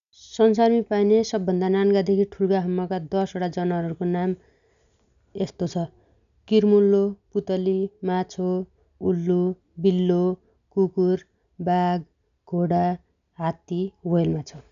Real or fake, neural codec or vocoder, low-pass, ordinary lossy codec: real; none; 7.2 kHz; none